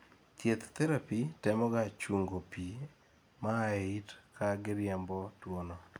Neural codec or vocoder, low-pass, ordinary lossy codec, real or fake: none; none; none; real